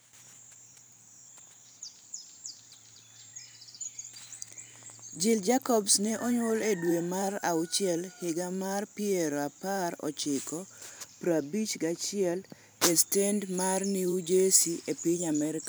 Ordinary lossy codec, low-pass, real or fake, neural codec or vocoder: none; none; fake; vocoder, 44.1 kHz, 128 mel bands every 512 samples, BigVGAN v2